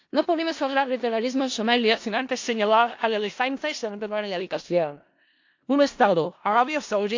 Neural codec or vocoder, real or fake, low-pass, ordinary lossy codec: codec, 16 kHz in and 24 kHz out, 0.4 kbps, LongCat-Audio-Codec, four codebook decoder; fake; 7.2 kHz; AAC, 48 kbps